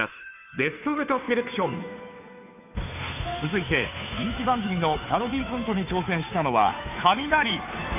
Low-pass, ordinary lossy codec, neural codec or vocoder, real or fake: 3.6 kHz; none; codec, 16 kHz, 2 kbps, FunCodec, trained on Chinese and English, 25 frames a second; fake